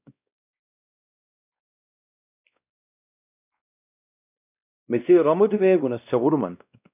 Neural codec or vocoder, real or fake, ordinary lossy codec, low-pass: codec, 16 kHz, 1 kbps, X-Codec, WavLM features, trained on Multilingual LibriSpeech; fake; AAC, 32 kbps; 3.6 kHz